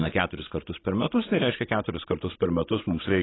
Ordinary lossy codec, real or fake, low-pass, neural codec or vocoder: AAC, 16 kbps; fake; 7.2 kHz; vocoder, 44.1 kHz, 80 mel bands, Vocos